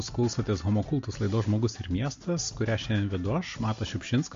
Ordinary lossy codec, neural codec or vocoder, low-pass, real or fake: MP3, 48 kbps; none; 7.2 kHz; real